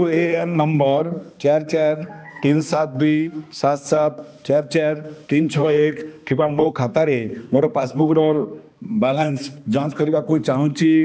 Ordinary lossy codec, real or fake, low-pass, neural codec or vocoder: none; fake; none; codec, 16 kHz, 2 kbps, X-Codec, HuBERT features, trained on balanced general audio